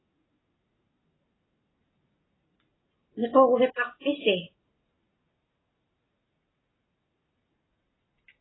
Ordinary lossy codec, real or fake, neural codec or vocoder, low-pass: AAC, 16 kbps; real; none; 7.2 kHz